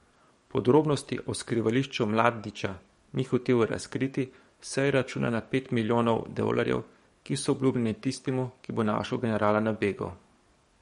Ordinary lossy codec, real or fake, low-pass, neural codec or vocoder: MP3, 48 kbps; fake; 19.8 kHz; codec, 44.1 kHz, 7.8 kbps, DAC